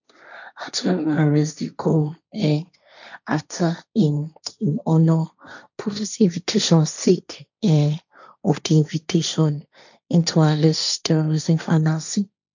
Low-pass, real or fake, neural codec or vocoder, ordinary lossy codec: 7.2 kHz; fake; codec, 16 kHz, 1.1 kbps, Voila-Tokenizer; none